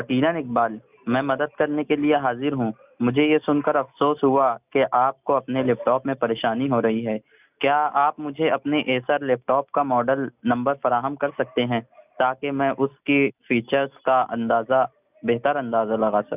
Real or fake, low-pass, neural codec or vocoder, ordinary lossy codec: real; 3.6 kHz; none; none